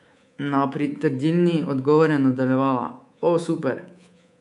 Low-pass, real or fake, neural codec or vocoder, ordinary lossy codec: 10.8 kHz; fake; codec, 24 kHz, 3.1 kbps, DualCodec; none